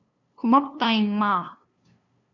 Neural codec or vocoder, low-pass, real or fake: codec, 16 kHz, 2 kbps, FunCodec, trained on LibriTTS, 25 frames a second; 7.2 kHz; fake